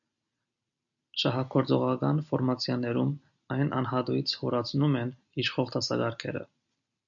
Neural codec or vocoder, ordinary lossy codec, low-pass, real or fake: none; MP3, 96 kbps; 7.2 kHz; real